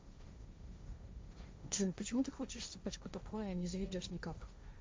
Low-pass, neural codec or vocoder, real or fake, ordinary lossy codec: none; codec, 16 kHz, 1.1 kbps, Voila-Tokenizer; fake; none